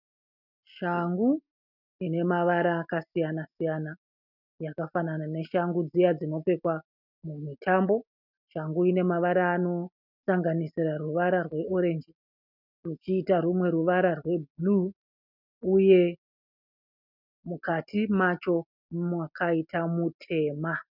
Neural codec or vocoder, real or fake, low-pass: none; real; 5.4 kHz